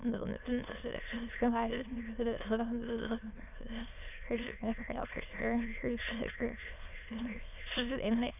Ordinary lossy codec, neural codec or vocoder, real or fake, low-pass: none; autoencoder, 22.05 kHz, a latent of 192 numbers a frame, VITS, trained on many speakers; fake; 3.6 kHz